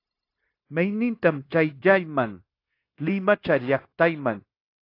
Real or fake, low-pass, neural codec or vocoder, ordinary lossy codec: fake; 5.4 kHz; codec, 16 kHz, 0.9 kbps, LongCat-Audio-Codec; AAC, 32 kbps